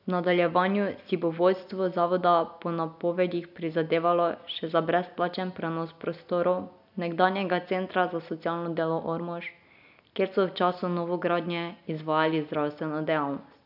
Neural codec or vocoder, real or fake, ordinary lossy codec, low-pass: none; real; none; 5.4 kHz